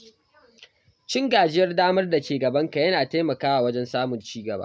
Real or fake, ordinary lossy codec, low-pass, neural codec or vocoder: real; none; none; none